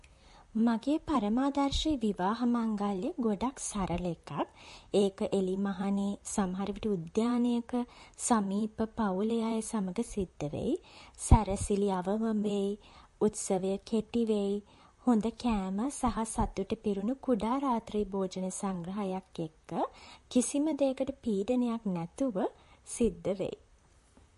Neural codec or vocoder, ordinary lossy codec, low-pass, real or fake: vocoder, 44.1 kHz, 128 mel bands, Pupu-Vocoder; MP3, 48 kbps; 14.4 kHz; fake